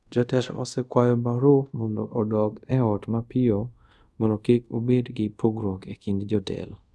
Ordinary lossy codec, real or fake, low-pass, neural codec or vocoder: none; fake; none; codec, 24 kHz, 0.5 kbps, DualCodec